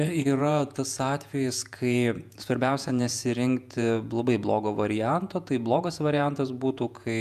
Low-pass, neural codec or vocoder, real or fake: 14.4 kHz; vocoder, 48 kHz, 128 mel bands, Vocos; fake